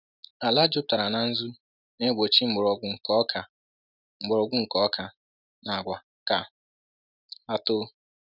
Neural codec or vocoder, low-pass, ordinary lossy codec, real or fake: none; 5.4 kHz; none; real